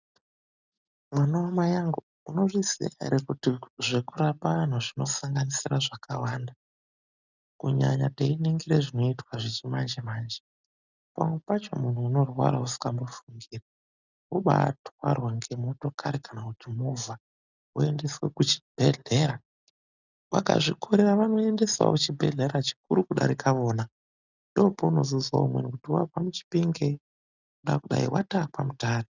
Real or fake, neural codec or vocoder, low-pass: real; none; 7.2 kHz